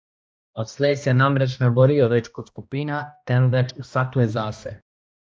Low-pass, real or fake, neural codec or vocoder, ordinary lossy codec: none; fake; codec, 16 kHz, 1 kbps, X-Codec, HuBERT features, trained on balanced general audio; none